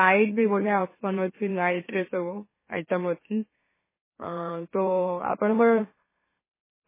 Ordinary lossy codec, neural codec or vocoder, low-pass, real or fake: MP3, 16 kbps; autoencoder, 44.1 kHz, a latent of 192 numbers a frame, MeloTTS; 3.6 kHz; fake